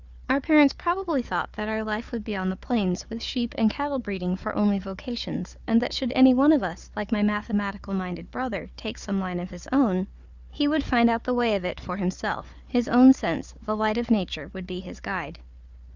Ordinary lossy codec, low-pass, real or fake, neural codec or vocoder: Opus, 64 kbps; 7.2 kHz; fake; codec, 16 kHz, 4 kbps, FunCodec, trained on Chinese and English, 50 frames a second